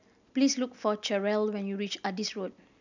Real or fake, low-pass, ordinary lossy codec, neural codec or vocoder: real; 7.2 kHz; none; none